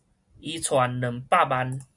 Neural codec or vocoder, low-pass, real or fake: none; 10.8 kHz; real